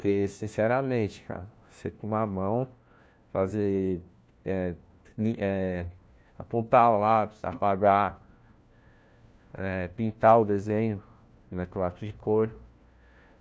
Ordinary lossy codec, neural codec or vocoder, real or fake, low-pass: none; codec, 16 kHz, 1 kbps, FunCodec, trained on LibriTTS, 50 frames a second; fake; none